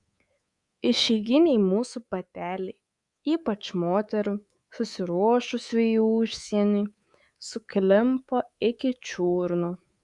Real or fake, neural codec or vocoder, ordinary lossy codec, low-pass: fake; codec, 24 kHz, 3.1 kbps, DualCodec; Opus, 64 kbps; 10.8 kHz